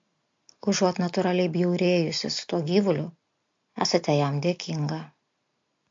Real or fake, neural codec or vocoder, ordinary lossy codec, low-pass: real; none; MP3, 48 kbps; 7.2 kHz